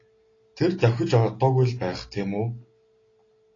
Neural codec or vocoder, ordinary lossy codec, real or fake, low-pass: none; AAC, 32 kbps; real; 7.2 kHz